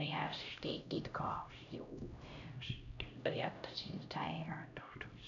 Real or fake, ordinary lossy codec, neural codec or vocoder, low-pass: fake; none; codec, 16 kHz, 0.5 kbps, X-Codec, HuBERT features, trained on LibriSpeech; 7.2 kHz